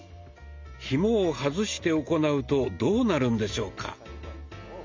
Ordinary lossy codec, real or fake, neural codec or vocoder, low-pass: MP3, 64 kbps; real; none; 7.2 kHz